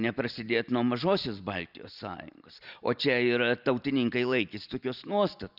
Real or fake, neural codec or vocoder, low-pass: real; none; 5.4 kHz